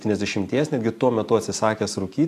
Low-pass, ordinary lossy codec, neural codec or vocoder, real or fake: 14.4 kHz; AAC, 64 kbps; none; real